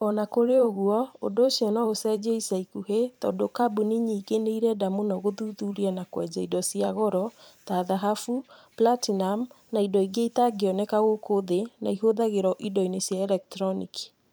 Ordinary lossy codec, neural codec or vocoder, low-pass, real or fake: none; vocoder, 44.1 kHz, 128 mel bands every 512 samples, BigVGAN v2; none; fake